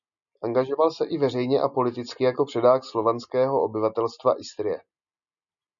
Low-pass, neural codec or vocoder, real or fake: 7.2 kHz; none; real